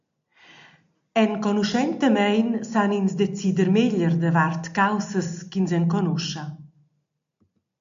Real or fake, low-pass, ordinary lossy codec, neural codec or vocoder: real; 7.2 kHz; AAC, 64 kbps; none